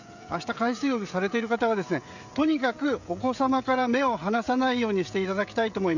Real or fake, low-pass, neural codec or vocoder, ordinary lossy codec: fake; 7.2 kHz; codec, 16 kHz, 16 kbps, FreqCodec, smaller model; none